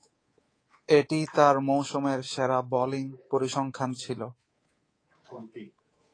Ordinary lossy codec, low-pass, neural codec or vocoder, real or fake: AAC, 32 kbps; 9.9 kHz; codec, 24 kHz, 3.1 kbps, DualCodec; fake